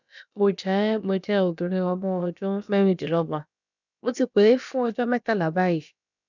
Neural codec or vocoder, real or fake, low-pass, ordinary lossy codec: codec, 16 kHz, about 1 kbps, DyCAST, with the encoder's durations; fake; 7.2 kHz; none